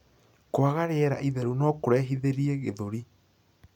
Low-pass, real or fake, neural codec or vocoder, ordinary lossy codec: 19.8 kHz; real; none; none